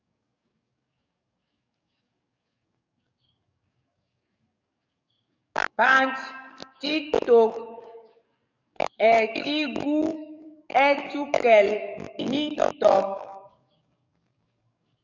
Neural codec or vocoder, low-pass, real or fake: codec, 44.1 kHz, 7.8 kbps, DAC; 7.2 kHz; fake